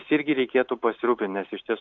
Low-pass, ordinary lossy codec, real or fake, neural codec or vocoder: 7.2 kHz; AAC, 64 kbps; real; none